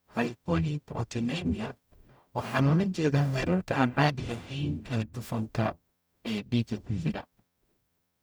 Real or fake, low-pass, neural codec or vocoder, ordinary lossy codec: fake; none; codec, 44.1 kHz, 0.9 kbps, DAC; none